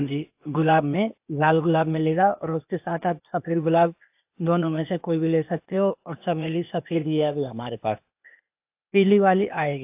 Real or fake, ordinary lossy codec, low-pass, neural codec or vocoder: fake; AAC, 32 kbps; 3.6 kHz; codec, 16 kHz, 0.8 kbps, ZipCodec